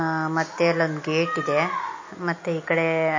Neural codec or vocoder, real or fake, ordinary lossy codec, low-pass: none; real; MP3, 32 kbps; 7.2 kHz